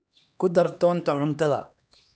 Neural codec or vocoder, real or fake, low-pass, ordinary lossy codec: codec, 16 kHz, 2 kbps, X-Codec, HuBERT features, trained on LibriSpeech; fake; none; none